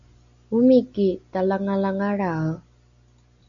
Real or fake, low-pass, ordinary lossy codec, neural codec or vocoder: real; 7.2 kHz; MP3, 96 kbps; none